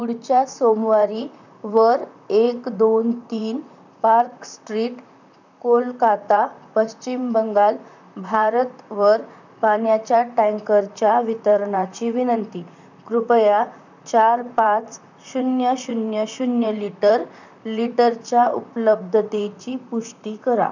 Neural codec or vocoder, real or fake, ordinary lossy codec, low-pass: vocoder, 44.1 kHz, 128 mel bands, Pupu-Vocoder; fake; none; 7.2 kHz